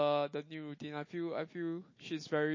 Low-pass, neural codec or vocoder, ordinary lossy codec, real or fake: 7.2 kHz; none; MP3, 32 kbps; real